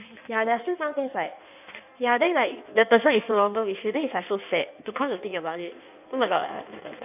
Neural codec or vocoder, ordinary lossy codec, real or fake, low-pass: codec, 16 kHz in and 24 kHz out, 1.1 kbps, FireRedTTS-2 codec; none; fake; 3.6 kHz